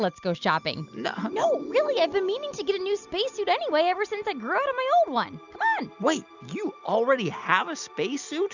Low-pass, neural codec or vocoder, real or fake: 7.2 kHz; none; real